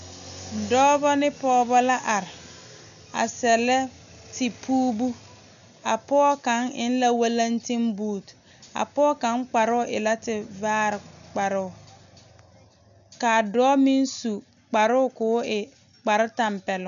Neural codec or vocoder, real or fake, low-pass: none; real; 7.2 kHz